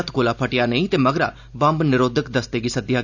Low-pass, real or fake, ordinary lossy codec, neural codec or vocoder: 7.2 kHz; real; none; none